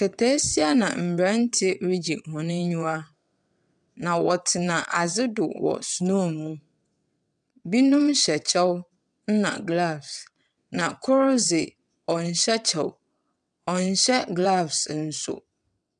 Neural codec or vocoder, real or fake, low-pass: vocoder, 22.05 kHz, 80 mel bands, WaveNeXt; fake; 9.9 kHz